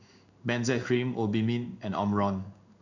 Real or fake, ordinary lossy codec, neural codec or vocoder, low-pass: fake; none; codec, 16 kHz in and 24 kHz out, 1 kbps, XY-Tokenizer; 7.2 kHz